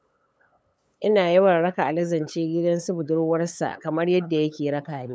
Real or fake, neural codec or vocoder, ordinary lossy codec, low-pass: fake; codec, 16 kHz, 8 kbps, FunCodec, trained on LibriTTS, 25 frames a second; none; none